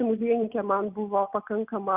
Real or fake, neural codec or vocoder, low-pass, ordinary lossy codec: real; none; 3.6 kHz; Opus, 32 kbps